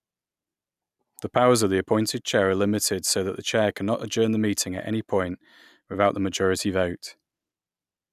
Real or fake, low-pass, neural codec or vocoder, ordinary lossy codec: real; 14.4 kHz; none; none